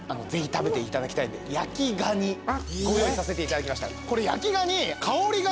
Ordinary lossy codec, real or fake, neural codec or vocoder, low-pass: none; real; none; none